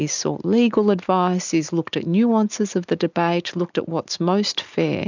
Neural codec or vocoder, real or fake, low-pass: none; real; 7.2 kHz